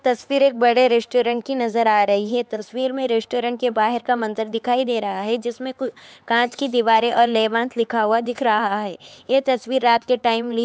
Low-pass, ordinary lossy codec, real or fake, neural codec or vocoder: none; none; fake; codec, 16 kHz, 4 kbps, X-Codec, HuBERT features, trained on LibriSpeech